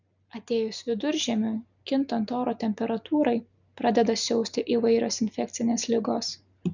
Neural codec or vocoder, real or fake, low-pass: none; real; 7.2 kHz